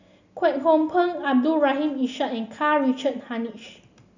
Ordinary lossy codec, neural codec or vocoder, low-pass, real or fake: none; none; 7.2 kHz; real